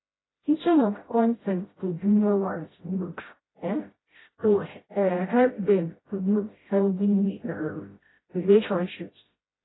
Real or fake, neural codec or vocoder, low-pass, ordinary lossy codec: fake; codec, 16 kHz, 0.5 kbps, FreqCodec, smaller model; 7.2 kHz; AAC, 16 kbps